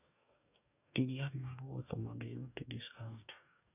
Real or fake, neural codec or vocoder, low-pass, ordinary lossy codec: fake; codec, 44.1 kHz, 2.6 kbps, DAC; 3.6 kHz; none